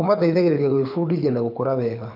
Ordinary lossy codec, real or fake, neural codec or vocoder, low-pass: none; fake; codec, 24 kHz, 6 kbps, HILCodec; 5.4 kHz